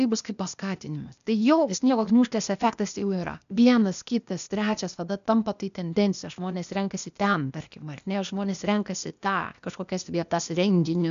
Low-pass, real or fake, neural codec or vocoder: 7.2 kHz; fake; codec, 16 kHz, 0.8 kbps, ZipCodec